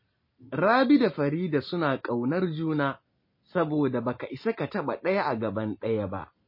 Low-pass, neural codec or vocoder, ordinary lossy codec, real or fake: 5.4 kHz; none; MP3, 24 kbps; real